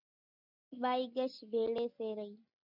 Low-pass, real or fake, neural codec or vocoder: 5.4 kHz; real; none